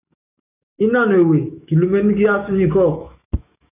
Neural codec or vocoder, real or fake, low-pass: autoencoder, 48 kHz, 128 numbers a frame, DAC-VAE, trained on Japanese speech; fake; 3.6 kHz